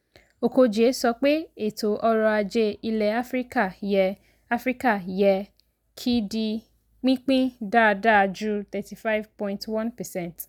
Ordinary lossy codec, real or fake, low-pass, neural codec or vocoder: none; real; 19.8 kHz; none